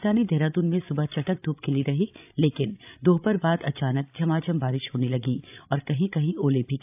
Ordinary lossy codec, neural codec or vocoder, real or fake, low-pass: AAC, 32 kbps; codec, 16 kHz, 16 kbps, FreqCodec, larger model; fake; 3.6 kHz